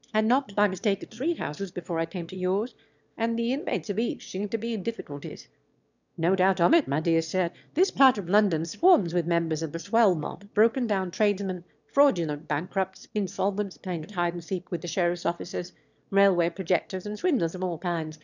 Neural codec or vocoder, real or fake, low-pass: autoencoder, 22.05 kHz, a latent of 192 numbers a frame, VITS, trained on one speaker; fake; 7.2 kHz